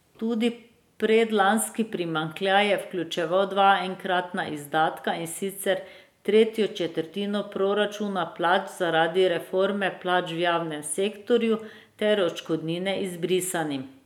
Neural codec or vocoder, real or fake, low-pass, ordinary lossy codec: none; real; 19.8 kHz; none